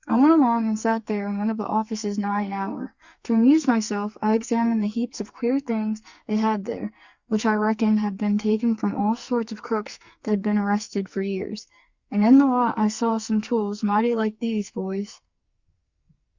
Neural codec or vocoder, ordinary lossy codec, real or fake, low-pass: codec, 44.1 kHz, 2.6 kbps, SNAC; Opus, 64 kbps; fake; 7.2 kHz